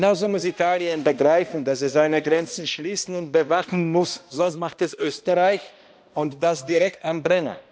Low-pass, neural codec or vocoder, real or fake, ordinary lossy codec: none; codec, 16 kHz, 1 kbps, X-Codec, HuBERT features, trained on balanced general audio; fake; none